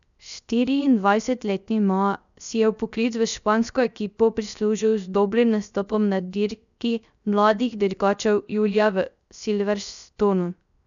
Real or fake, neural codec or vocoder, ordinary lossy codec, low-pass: fake; codec, 16 kHz, 0.3 kbps, FocalCodec; none; 7.2 kHz